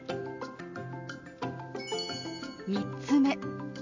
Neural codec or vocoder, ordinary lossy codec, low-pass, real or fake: none; MP3, 64 kbps; 7.2 kHz; real